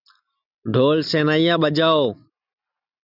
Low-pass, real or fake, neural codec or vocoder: 5.4 kHz; real; none